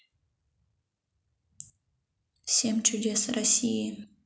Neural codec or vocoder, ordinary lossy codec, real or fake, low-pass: none; none; real; none